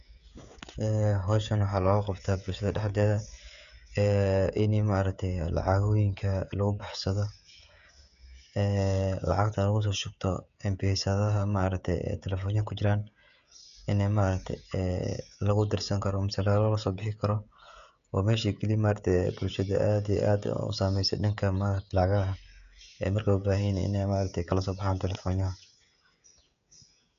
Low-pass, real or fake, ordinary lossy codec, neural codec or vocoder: 7.2 kHz; fake; none; codec, 16 kHz, 16 kbps, FreqCodec, smaller model